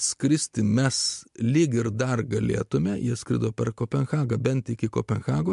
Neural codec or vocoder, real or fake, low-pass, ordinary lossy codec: none; real; 10.8 kHz; MP3, 64 kbps